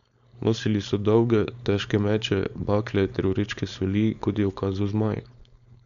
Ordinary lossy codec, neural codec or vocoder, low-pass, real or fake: MP3, 96 kbps; codec, 16 kHz, 4.8 kbps, FACodec; 7.2 kHz; fake